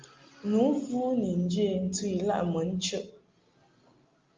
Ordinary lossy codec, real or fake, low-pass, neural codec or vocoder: Opus, 24 kbps; real; 7.2 kHz; none